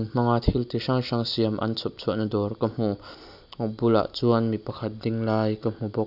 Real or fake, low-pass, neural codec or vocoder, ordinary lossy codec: real; 5.4 kHz; none; none